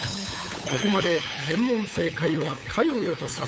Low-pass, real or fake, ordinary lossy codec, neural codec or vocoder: none; fake; none; codec, 16 kHz, 8 kbps, FunCodec, trained on LibriTTS, 25 frames a second